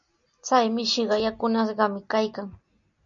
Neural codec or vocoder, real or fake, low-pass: none; real; 7.2 kHz